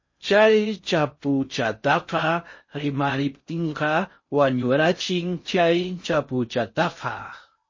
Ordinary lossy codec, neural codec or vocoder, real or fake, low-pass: MP3, 32 kbps; codec, 16 kHz in and 24 kHz out, 0.6 kbps, FocalCodec, streaming, 4096 codes; fake; 7.2 kHz